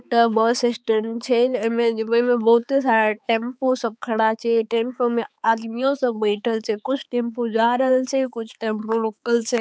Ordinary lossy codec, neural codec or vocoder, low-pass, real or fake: none; codec, 16 kHz, 4 kbps, X-Codec, HuBERT features, trained on balanced general audio; none; fake